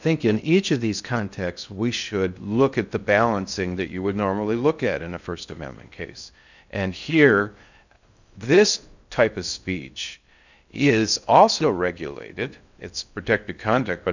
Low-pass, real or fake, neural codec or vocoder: 7.2 kHz; fake; codec, 16 kHz in and 24 kHz out, 0.6 kbps, FocalCodec, streaming, 2048 codes